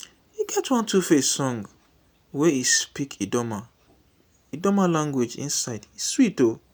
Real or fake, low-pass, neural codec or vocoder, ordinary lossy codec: real; none; none; none